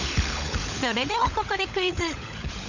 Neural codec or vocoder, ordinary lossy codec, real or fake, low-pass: codec, 16 kHz, 16 kbps, FunCodec, trained on LibriTTS, 50 frames a second; none; fake; 7.2 kHz